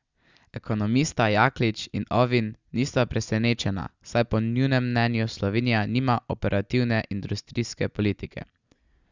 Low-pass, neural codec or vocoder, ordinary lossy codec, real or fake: 7.2 kHz; none; Opus, 64 kbps; real